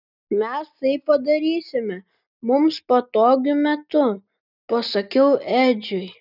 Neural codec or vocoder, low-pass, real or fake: none; 5.4 kHz; real